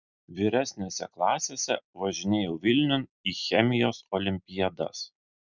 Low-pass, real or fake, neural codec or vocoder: 7.2 kHz; real; none